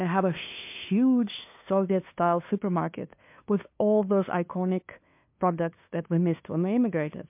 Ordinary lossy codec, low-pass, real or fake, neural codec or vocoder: MP3, 32 kbps; 3.6 kHz; fake; codec, 16 kHz, 2 kbps, FunCodec, trained on LibriTTS, 25 frames a second